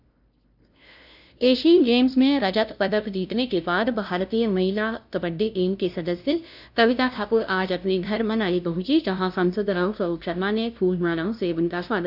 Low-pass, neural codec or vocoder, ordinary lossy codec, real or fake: 5.4 kHz; codec, 16 kHz, 0.5 kbps, FunCodec, trained on LibriTTS, 25 frames a second; none; fake